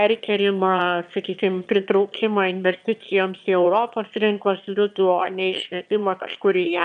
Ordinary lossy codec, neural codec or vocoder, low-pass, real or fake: AAC, 96 kbps; autoencoder, 22.05 kHz, a latent of 192 numbers a frame, VITS, trained on one speaker; 9.9 kHz; fake